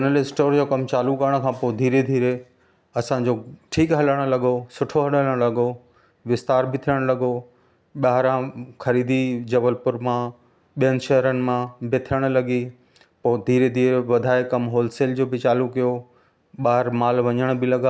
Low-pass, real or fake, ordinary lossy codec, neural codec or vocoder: none; real; none; none